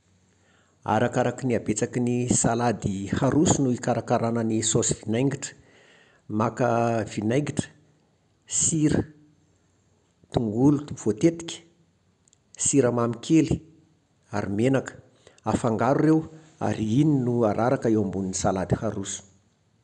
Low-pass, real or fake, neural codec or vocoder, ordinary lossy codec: 10.8 kHz; real; none; none